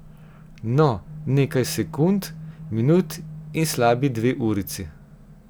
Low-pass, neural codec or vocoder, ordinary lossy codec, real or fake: none; none; none; real